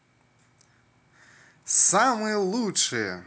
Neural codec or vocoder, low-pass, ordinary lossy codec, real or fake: none; none; none; real